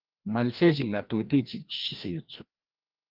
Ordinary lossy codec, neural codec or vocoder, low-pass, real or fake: Opus, 32 kbps; codec, 16 kHz, 1 kbps, FreqCodec, larger model; 5.4 kHz; fake